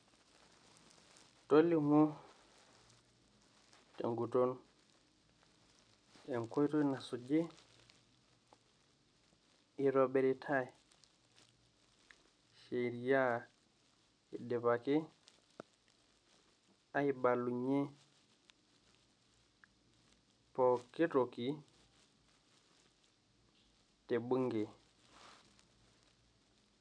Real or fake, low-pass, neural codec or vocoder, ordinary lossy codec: real; 9.9 kHz; none; none